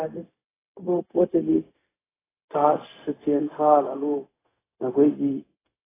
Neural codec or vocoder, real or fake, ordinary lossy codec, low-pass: codec, 16 kHz, 0.4 kbps, LongCat-Audio-Codec; fake; AAC, 16 kbps; 3.6 kHz